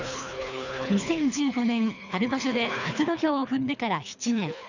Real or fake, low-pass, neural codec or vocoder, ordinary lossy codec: fake; 7.2 kHz; codec, 24 kHz, 3 kbps, HILCodec; none